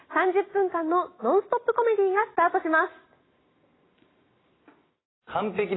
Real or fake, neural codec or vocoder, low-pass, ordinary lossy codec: real; none; 7.2 kHz; AAC, 16 kbps